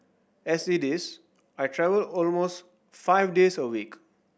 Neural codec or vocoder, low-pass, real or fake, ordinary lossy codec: none; none; real; none